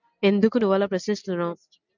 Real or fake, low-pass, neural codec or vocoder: real; 7.2 kHz; none